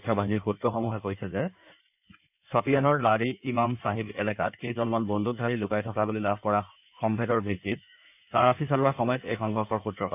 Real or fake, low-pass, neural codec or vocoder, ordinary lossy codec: fake; 3.6 kHz; codec, 16 kHz in and 24 kHz out, 1.1 kbps, FireRedTTS-2 codec; none